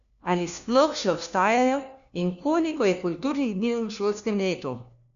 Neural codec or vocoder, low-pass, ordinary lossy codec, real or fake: codec, 16 kHz, 1 kbps, FunCodec, trained on LibriTTS, 50 frames a second; 7.2 kHz; none; fake